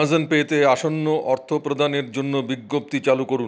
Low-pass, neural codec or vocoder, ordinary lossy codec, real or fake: none; none; none; real